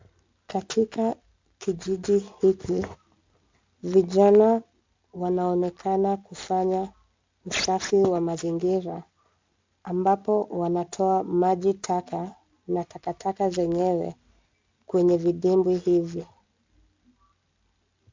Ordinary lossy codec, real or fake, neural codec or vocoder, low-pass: MP3, 64 kbps; real; none; 7.2 kHz